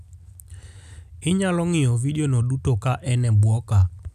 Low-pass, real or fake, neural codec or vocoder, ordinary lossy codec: 14.4 kHz; real; none; none